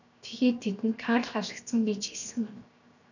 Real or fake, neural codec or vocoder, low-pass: fake; codec, 16 kHz, 0.7 kbps, FocalCodec; 7.2 kHz